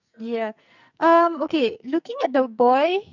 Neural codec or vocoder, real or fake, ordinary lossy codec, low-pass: codec, 44.1 kHz, 2.6 kbps, SNAC; fake; none; 7.2 kHz